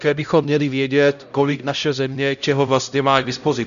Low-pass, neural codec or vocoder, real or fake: 7.2 kHz; codec, 16 kHz, 0.5 kbps, X-Codec, HuBERT features, trained on LibriSpeech; fake